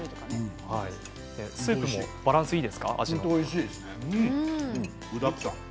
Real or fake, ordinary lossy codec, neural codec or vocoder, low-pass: real; none; none; none